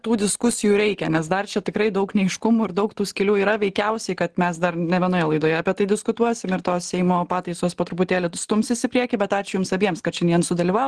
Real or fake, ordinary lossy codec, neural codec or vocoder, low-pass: real; Opus, 16 kbps; none; 10.8 kHz